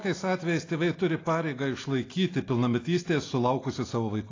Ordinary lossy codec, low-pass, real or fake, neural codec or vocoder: AAC, 32 kbps; 7.2 kHz; real; none